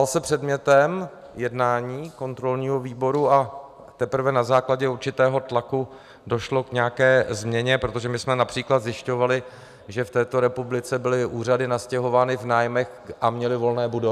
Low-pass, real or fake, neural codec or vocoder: 14.4 kHz; real; none